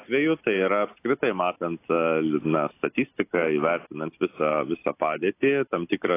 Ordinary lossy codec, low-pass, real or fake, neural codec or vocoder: AAC, 24 kbps; 3.6 kHz; real; none